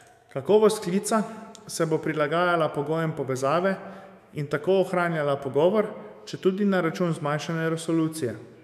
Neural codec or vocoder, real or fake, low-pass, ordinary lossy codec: autoencoder, 48 kHz, 128 numbers a frame, DAC-VAE, trained on Japanese speech; fake; 14.4 kHz; none